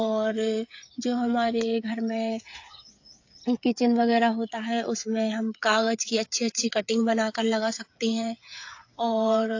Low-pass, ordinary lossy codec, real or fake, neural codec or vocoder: 7.2 kHz; AAC, 48 kbps; fake; codec, 16 kHz, 8 kbps, FreqCodec, smaller model